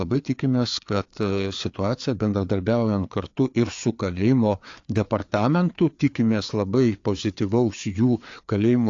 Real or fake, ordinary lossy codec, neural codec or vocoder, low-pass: fake; AAC, 48 kbps; codec, 16 kHz, 4 kbps, FreqCodec, larger model; 7.2 kHz